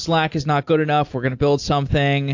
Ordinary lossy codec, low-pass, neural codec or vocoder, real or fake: AAC, 48 kbps; 7.2 kHz; none; real